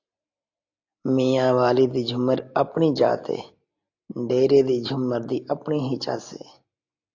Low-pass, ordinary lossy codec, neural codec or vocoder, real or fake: 7.2 kHz; AAC, 48 kbps; none; real